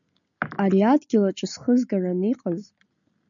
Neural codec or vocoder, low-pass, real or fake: none; 7.2 kHz; real